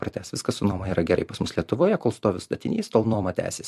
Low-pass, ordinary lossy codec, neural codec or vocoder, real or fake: 14.4 kHz; MP3, 64 kbps; vocoder, 44.1 kHz, 128 mel bands every 256 samples, BigVGAN v2; fake